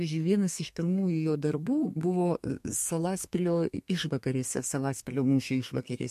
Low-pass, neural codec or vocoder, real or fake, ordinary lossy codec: 14.4 kHz; codec, 32 kHz, 1.9 kbps, SNAC; fake; MP3, 64 kbps